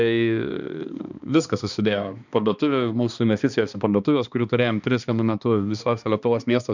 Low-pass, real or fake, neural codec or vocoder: 7.2 kHz; fake; codec, 16 kHz, 2 kbps, X-Codec, HuBERT features, trained on balanced general audio